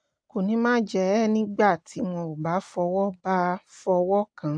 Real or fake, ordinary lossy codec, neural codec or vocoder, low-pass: real; AAC, 64 kbps; none; 9.9 kHz